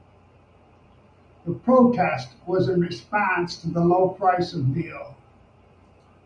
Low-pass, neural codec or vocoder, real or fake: 9.9 kHz; none; real